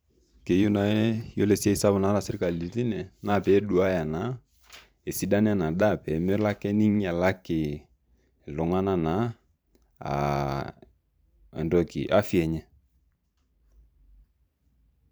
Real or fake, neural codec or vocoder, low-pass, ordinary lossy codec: fake; vocoder, 44.1 kHz, 128 mel bands every 512 samples, BigVGAN v2; none; none